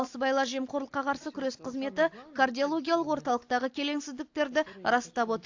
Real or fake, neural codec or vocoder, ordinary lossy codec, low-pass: real; none; AAC, 48 kbps; 7.2 kHz